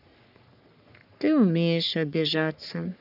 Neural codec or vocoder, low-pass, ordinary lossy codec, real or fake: codec, 44.1 kHz, 3.4 kbps, Pupu-Codec; 5.4 kHz; none; fake